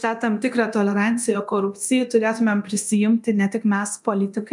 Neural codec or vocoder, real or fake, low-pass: codec, 24 kHz, 0.9 kbps, DualCodec; fake; 10.8 kHz